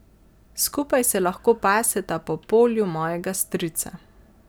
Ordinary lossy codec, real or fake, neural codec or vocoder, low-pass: none; real; none; none